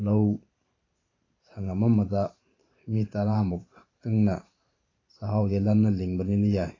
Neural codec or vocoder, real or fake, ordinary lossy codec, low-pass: vocoder, 44.1 kHz, 128 mel bands every 512 samples, BigVGAN v2; fake; AAC, 32 kbps; 7.2 kHz